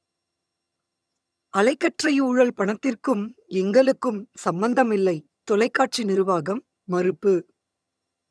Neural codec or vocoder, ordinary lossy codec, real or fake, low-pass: vocoder, 22.05 kHz, 80 mel bands, HiFi-GAN; none; fake; none